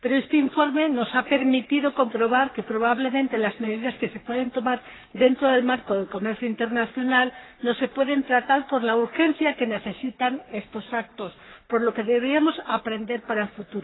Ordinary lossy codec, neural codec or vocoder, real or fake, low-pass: AAC, 16 kbps; codec, 44.1 kHz, 3.4 kbps, Pupu-Codec; fake; 7.2 kHz